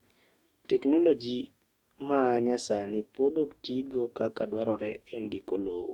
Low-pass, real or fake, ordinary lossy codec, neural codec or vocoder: 19.8 kHz; fake; Opus, 64 kbps; codec, 44.1 kHz, 2.6 kbps, DAC